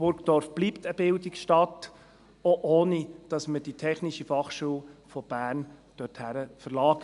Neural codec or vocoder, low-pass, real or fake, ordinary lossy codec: none; 10.8 kHz; real; none